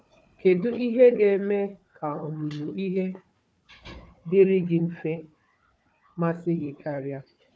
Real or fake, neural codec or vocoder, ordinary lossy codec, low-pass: fake; codec, 16 kHz, 4 kbps, FunCodec, trained on Chinese and English, 50 frames a second; none; none